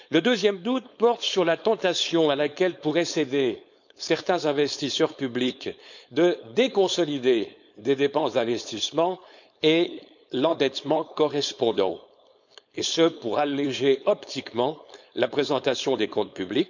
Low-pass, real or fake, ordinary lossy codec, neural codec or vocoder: 7.2 kHz; fake; none; codec, 16 kHz, 4.8 kbps, FACodec